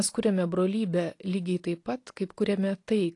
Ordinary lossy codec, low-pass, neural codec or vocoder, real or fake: AAC, 48 kbps; 10.8 kHz; none; real